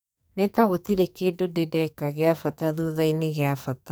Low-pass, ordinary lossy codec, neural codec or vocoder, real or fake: none; none; codec, 44.1 kHz, 2.6 kbps, SNAC; fake